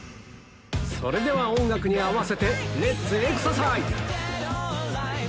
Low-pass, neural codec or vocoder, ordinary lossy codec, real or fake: none; none; none; real